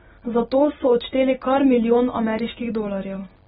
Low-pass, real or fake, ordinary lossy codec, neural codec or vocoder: 10.8 kHz; real; AAC, 16 kbps; none